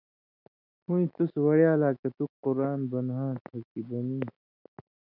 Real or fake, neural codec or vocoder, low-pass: real; none; 5.4 kHz